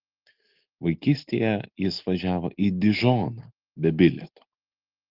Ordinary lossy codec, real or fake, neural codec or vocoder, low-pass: Opus, 16 kbps; real; none; 5.4 kHz